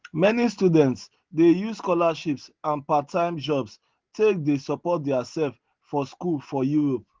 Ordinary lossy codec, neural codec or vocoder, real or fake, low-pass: Opus, 16 kbps; none; real; 7.2 kHz